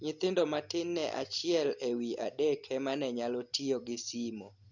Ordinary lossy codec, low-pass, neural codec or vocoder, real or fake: none; 7.2 kHz; none; real